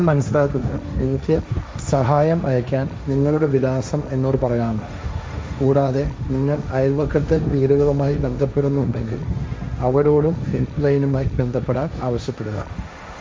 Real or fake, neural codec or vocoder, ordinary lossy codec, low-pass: fake; codec, 16 kHz, 1.1 kbps, Voila-Tokenizer; none; none